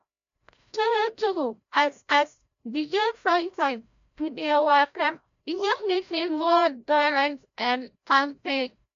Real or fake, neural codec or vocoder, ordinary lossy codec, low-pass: fake; codec, 16 kHz, 0.5 kbps, FreqCodec, larger model; MP3, 64 kbps; 7.2 kHz